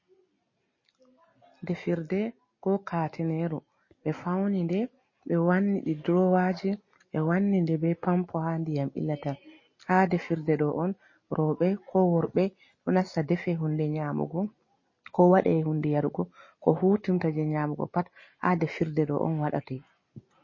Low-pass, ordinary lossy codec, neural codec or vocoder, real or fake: 7.2 kHz; MP3, 32 kbps; none; real